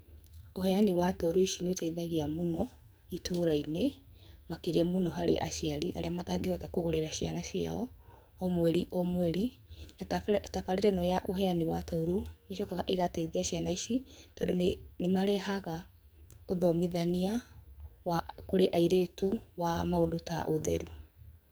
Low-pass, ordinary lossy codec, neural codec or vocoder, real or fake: none; none; codec, 44.1 kHz, 2.6 kbps, SNAC; fake